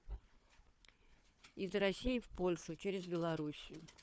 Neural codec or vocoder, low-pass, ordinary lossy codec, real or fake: codec, 16 kHz, 4 kbps, FunCodec, trained on Chinese and English, 50 frames a second; none; none; fake